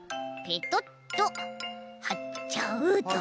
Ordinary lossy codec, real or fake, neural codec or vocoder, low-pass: none; real; none; none